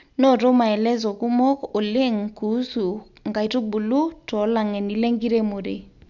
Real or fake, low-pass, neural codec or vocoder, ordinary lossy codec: real; 7.2 kHz; none; none